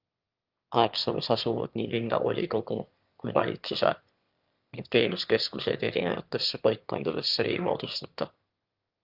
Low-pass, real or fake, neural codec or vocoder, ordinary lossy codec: 5.4 kHz; fake; autoencoder, 22.05 kHz, a latent of 192 numbers a frame, VITS, trained on one speaker; Opus, 32 kbps